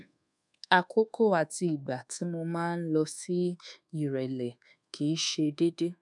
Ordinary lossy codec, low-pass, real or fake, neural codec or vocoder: none; 10.8 kHz; fake; codec, 24 kHz, 1.2 kbps, DualCodec